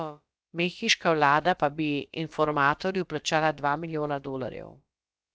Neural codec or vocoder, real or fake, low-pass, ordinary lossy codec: codec, 16 kHz, about 1 kbps, DyCAST, with the encoder's durations; fake; none; none